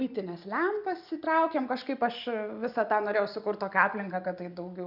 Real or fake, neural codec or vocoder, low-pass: real; none; 5.4 kHz